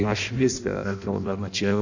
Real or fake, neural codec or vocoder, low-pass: fake; codec, 16 kHz in and 24 kHz out, 0.6 kbps, FireRedTTS-2 codec; 7.2 kHz